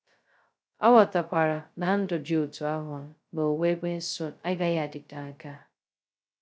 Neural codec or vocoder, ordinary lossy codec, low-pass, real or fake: codec, 16 kHz, 0.2 kbps, FocalCodec; none; none; fake